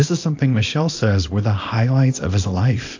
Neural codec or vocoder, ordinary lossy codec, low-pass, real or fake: codec, 24 kHz, 0.9 kbps, WavTokenizer, small release; AAC, 48 kbps; 7.2 kHz; fake